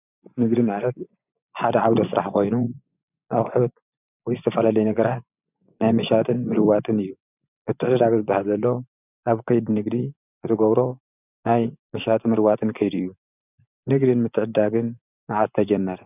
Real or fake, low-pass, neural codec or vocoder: fake; 3.6 kHz; vocoder, 24 kHz, 100 mel bands, Vocos